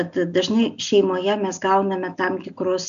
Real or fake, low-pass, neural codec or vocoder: real; 7.2 kHz; none